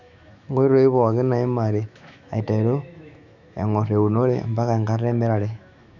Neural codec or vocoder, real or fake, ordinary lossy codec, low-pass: autoencoder, 48 kHz, 128 numbers a frame, DAC-VAE, trained on Japanese speech; fake; none; 7.2 kHz